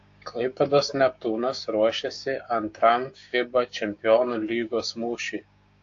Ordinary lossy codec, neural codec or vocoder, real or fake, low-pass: AAC, 48 kbps; codec, 16 kHz, 6 kbps, DAC; fake; 7.2 kHz